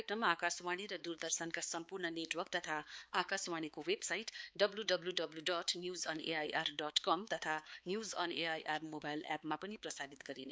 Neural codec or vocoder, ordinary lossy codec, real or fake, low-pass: codec, 16 kHz, 4 kbps, X-Codec, HuBERT features, trained on balanced general audio; none; fake; none